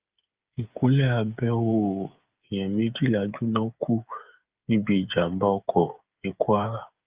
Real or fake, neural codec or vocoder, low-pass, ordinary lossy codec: fake; codec, 16 kHz, 8 kbps, FreqCodec, smaller model; 3.6 kHz; Opus, 24 kbps